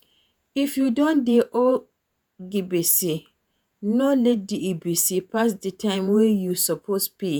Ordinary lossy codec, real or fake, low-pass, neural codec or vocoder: none; fake; none; vocoder, 48 kHz, 128 mel bands, Vocos